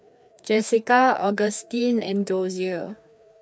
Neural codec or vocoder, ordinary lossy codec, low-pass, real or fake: codec, 16 kHz, 2 kbps, FreqCodec, larger model; none; none; fake